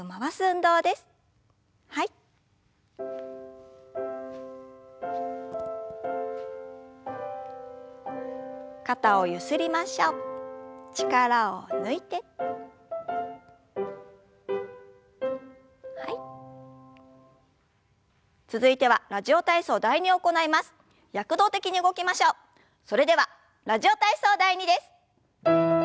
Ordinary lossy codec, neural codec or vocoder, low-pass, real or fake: none; none; none; real